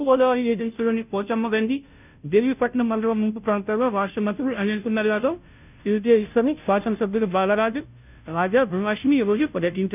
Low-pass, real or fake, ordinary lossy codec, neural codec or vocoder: 3.6 kHz; fake; none; codec, 16 kHz, 0.5 kbps, FunCodec, trained on Chinese and English, 25 frames a second